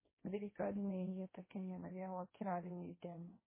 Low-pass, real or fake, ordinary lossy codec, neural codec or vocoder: 3.6 kHz; fake; MP3, 16 kbps; codec, 16 kHz, 1.1 kbps, Voila-Tokenizer